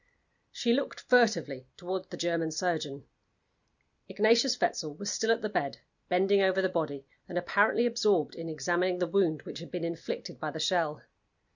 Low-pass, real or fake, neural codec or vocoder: 7.2 kHz; real; none